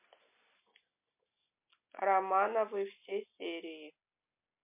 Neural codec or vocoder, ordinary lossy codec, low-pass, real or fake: none; MP3, 32 kbps; 3.6 kHz; real